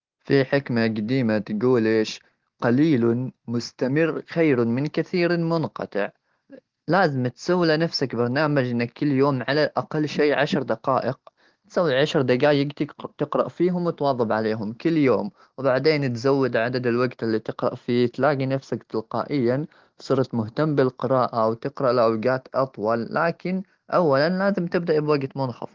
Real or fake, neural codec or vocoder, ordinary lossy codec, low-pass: real; none; Opus, 16 kbps; 7.2 kHz